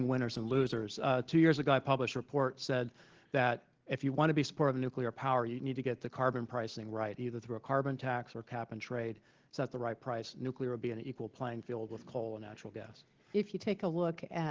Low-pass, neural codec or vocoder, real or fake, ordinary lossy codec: 7.2 kHz; none; real; Opus, 16 kbps